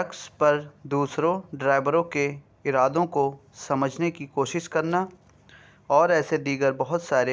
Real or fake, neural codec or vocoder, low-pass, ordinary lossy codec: real; none; none; none